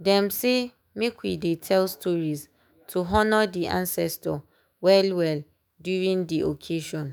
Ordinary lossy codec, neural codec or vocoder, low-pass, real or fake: none; autoencoder, 48 kHz, 128 numbers a frame, DAC-VAE, trained on Japanese speech; none; fake